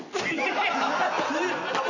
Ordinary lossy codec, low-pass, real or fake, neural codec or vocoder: none; 7.2 kHz; real; none